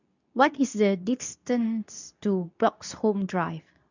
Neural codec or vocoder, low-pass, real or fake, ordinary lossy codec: codec, 24 kHz, 0.9 kbps, WavTokenizer, medium speech release version 2; 7.2 kHz; fake; none